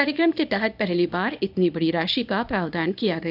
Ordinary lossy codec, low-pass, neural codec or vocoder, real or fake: none; 5.4 kHz; codec, 24 kHz, 0.9 kbps, WavTokenizer, small release; fake